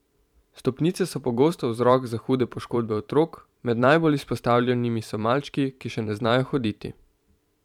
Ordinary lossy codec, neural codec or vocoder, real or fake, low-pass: none; vocoder, 44.1 kHz, 128 mel bands every 512 samples, BigVGAN v2; fake; 19.8 kHz